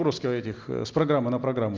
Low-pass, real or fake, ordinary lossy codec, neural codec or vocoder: 7.2 kHz; real; Opus, 24 kbps; none